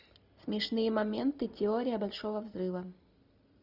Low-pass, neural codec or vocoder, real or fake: 5.4 kHz; none; real